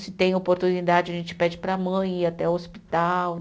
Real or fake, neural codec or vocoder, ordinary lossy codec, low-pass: real; none; none; none